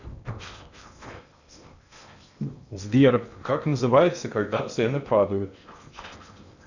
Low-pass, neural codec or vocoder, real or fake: 7.2 kHz; codec, 16 kHz in and 24 kHz out, 0.8 kbps, FocalCodec, streaming, 65536 codes; fake